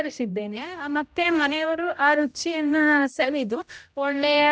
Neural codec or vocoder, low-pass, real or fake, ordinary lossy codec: codec, 16 kHz, 0.5 kbps, X-Codec, HuBERT features, trained on general audio; none; fake; none